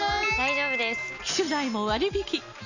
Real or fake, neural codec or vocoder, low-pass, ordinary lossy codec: real; none; 7.2 kHz; none